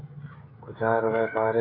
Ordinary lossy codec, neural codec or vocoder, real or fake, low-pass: AAC, 24 kbps; codec, 16 kHz, 16 kbps, FreqCodec, smaller model; fake; 5.4 kHz